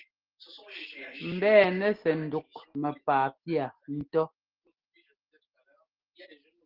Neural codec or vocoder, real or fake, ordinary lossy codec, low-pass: none; real; Opus, 16 kbps; 5.4 kHz